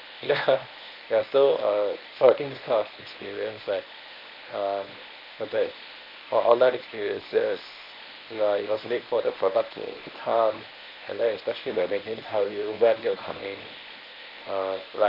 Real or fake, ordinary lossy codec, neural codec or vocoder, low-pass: fake; none; codec, 24 kHz, 0.9 kbps, WavTokenizer, medium speech release version 1; 5.4 kHz